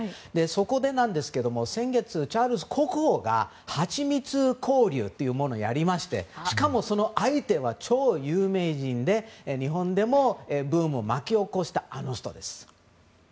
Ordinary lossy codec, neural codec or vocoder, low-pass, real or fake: none; none; none; real